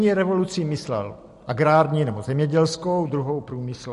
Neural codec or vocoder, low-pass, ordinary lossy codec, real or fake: none; 14.4 kHz; MP3, 48 kbps; real